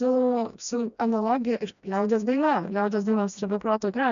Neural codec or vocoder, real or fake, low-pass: codec, 16 kHz, 1 kbps, FreqCodec, smaller model; fake; 7.2 kHz